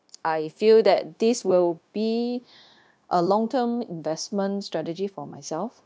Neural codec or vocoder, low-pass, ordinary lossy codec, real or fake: codec, 16 kHz, 0.9 kbps, LongCat-Audio-Codec; none; none; fake